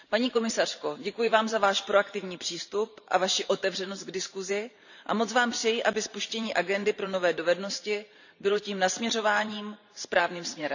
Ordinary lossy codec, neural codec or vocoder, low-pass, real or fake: none; vocoder, 44.1 kHz, 128 mel bands every 512 samples, BigVGAN v2; 7.2 kHz; fake